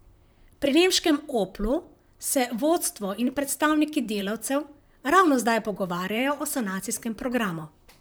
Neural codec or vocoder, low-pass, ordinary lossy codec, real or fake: vocoder, 44.1 kHz, 128 mel bands, Pupu-Vocoder; none; none; fake